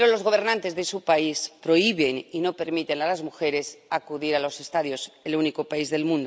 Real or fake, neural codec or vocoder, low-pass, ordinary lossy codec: real; none; none; none